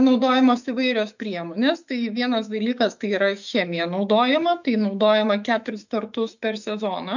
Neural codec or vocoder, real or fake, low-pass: autoencoder, 48 kHz, 128 numbers a frame, DAC-VAE, trained on Japanese speech; fake; 7.2 kHz